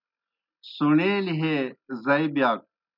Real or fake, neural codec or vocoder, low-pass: real; none; 5.4 kHz